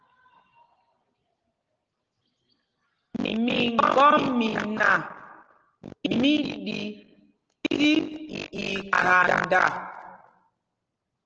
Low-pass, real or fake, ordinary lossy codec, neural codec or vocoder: 7.2 kHz; real; Opus, 16 kbps; none